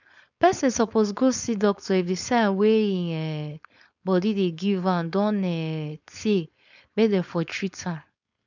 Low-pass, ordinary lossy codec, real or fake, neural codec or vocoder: 7.2 kHz; none; fake; codec, 16 kHz, 4.8 kbps, FACodec